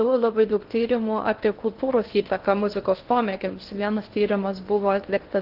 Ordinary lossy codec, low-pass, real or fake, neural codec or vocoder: Opus, 16 kbps; 5.4 kHz; fake; codec, 16 kHz in and 24 kHz out, 0.6 kbps, FocalCodec, streaming, 2048 codes